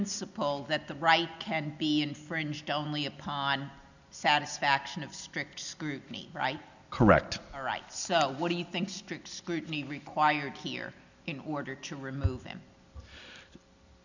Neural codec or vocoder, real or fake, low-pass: none; real; 7.2 kHz